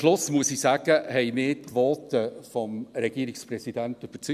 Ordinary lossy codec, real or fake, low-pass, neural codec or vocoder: none; real; 14.4 kHz; none